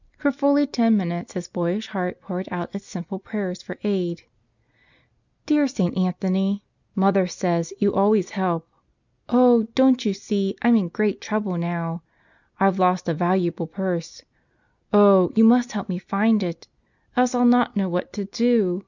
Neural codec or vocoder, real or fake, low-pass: none; real; 7.2 kHz